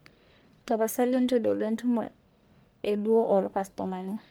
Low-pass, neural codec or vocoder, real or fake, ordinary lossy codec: none; codec, 44.1 kHz, 1.7 kbps, Pupu-Codec; fake; none